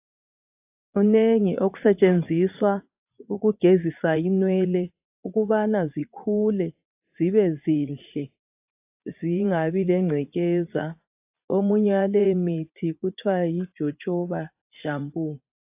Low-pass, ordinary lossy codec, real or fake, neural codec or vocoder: 3.6 kHz; AAC, 32 kbps; fake; vocoder, 44.1 kHz, 80 mel bands, Vocos